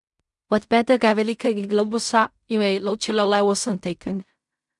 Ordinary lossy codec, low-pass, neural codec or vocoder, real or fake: none; 10.8 kHz; codec, 16 kHz in and 24 kHz out, 0.4 kbps, LongCat-Audio-Codec, fine tuned four codebook decoder; fake